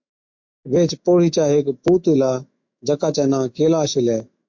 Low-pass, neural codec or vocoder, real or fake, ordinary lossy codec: 7.2 kHz; autoencoder, 48 kHz, 128 numbers a frame, DAC-VAE, trained on Japanese speech; fake; MP3, 48 kbps